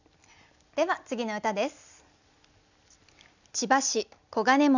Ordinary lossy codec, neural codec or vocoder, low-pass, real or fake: none; none; 7.2 kHz; real